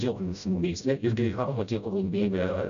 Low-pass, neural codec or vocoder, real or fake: 7.2 kHz; codec, 16 kHz, 0.5 kbps, FreqCodec, smaller model; fake